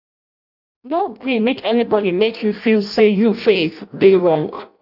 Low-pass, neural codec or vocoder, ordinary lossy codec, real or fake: 5.4 kHz; codec, 16 kHz in and 24 kHz out, 0.6 kbps, FireRedTTS-2 codec; none; fake